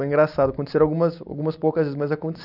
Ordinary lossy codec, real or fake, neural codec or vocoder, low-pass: none; real; none; 5.4 kHz